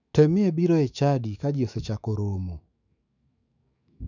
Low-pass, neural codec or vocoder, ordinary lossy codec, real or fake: 7.2 kHz; autoencoder, 48 kHz, 128 numbers a frame, DAC-VAE, trained on Japanese speech; none; fake